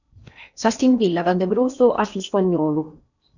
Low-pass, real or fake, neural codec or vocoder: 7.2 kHz; fake; codec, 16 kHz in and 24 kHz out, 0.8 kbps, FocalCodec, streaming, 65536 codes